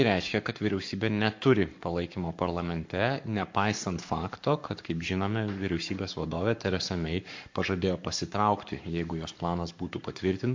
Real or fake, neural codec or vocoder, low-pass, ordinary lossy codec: fake; codec, 44.1 kHz, 7.8 kbps, Pupu-Codec; 7.2 kHz; MP3, 48 kbps